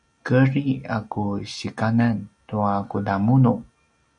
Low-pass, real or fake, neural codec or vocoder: 9.9 kHz; real; none